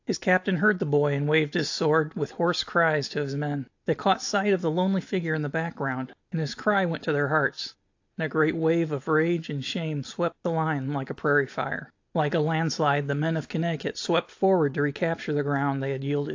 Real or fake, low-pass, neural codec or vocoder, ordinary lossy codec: real; 7.2 kHz; none; AAC, 48 kbps